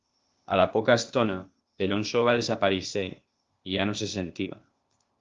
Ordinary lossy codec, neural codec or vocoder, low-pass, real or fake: Opus, 32 kbps; codec, 16 kHz, 0.8 kbps, ZipCodec; 7.2 kHz; fake